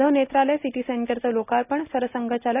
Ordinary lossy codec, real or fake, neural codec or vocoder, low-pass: none; real; none; 3.6 kHz